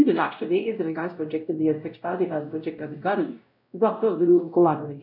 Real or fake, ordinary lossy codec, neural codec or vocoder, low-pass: fake; none; codec, 16 kHz, 0.5 kbps, X-Codec, WavLM features, trained on Multilingual LibriSpeech; 5.4 kHz